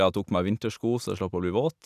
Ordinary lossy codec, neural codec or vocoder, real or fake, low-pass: none; none; real; 14.4 kHz